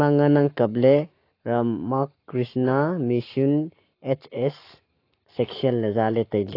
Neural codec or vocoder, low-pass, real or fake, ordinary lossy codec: codec, 44.1 kHz, 7.8 kbps, Pupu-Codec; 5.4 kHz; fake; AAC, 32 kbps